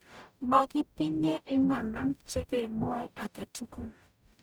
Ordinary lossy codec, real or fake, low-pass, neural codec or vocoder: none; fake; none; codec, 44.1 kHz, 0.9 kbps, DAC